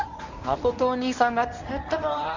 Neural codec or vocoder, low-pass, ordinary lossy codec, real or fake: codec, 24 kHz, 0.9 kbps, WavTokenizer, medium speech release version 1; 7.2 kHz; none; fake